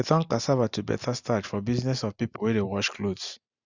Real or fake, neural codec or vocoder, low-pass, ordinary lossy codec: real; none; 7.2 kHz; Opus, 64 kbps